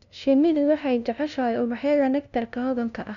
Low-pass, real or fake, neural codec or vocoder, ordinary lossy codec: 7.2 kHz; fake; codec, 16 kHz, 0.5 kbps, FunCodec, trained on LibriTTS, 25 frames a second; none